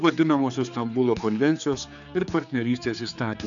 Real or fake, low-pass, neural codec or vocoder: fake; 7.2 kHz; codec, 16 kHz, 4 kbps, X-Codec, HuBERT features, trained on general audio